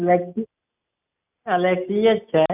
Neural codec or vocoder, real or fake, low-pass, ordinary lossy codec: none; real; 3.6 kHz; none